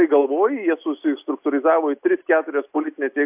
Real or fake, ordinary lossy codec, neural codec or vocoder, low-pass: real; AAC, 32 kbps; none; 3.6 kHz